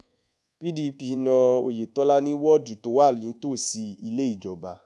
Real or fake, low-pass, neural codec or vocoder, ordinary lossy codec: fake; none; codec, 24 kHz, 1.2 kbps, DualCodec; none